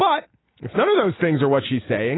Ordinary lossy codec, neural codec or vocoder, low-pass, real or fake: AAC, 16 kbps; none; 7.2 kHz; real